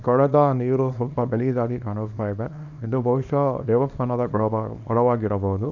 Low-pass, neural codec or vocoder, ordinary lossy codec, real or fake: 7.2 kHz; codec, 24 kHz, 0.9 kbps, WavTokenizer, small release; none; fake